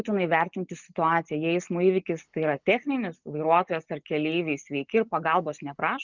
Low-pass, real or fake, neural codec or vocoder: 7.2 kHz; real; none